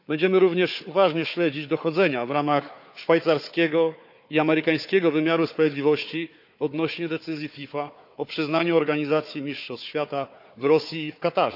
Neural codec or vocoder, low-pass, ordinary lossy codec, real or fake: codec, 16 kHz, 4 kbps, FunCodec, trained on Chinese and English, 50 frames a second; 5.4 kHz; none; fake